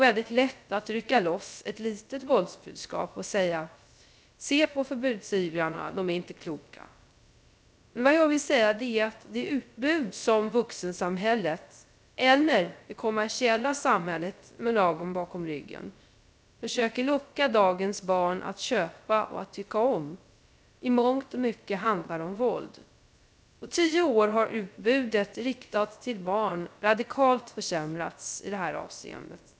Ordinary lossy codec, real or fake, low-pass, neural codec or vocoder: none; fake; none; codec, 16 kHz, 0.3 kbps, FocalCodec